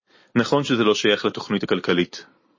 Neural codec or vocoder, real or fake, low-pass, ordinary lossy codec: none; real; 7.2 kHz; MP3, 32 kbps